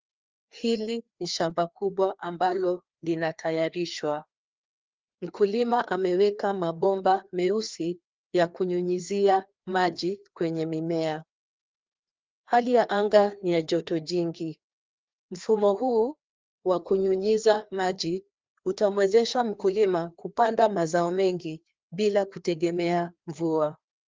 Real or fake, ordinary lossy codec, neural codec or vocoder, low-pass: fake; Opus, 32 kbps; codec, 16 kHz, 2 kbps, FreqCodec, larger model; 7.2 kHz